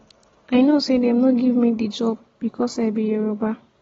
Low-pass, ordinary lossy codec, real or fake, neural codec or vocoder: 7.2 kHz; AAC, 24 kbps; real; none